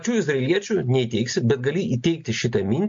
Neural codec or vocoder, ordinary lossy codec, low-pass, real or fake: none; MP3, 48 kbps; 7.2 kHz; real